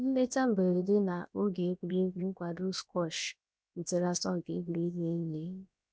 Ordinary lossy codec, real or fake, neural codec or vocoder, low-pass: none; fake; codec, 16 kHz, about 1 kbps, DyCAST, with the encoder's durations; none